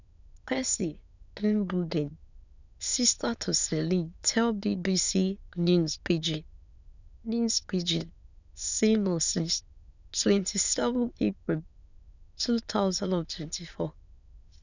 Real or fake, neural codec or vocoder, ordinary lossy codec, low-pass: fake; autoencoder, 22.05 kHz, a latent of 192 numbers a frame, VITS, trained on many speakers; none; 7.2 kHz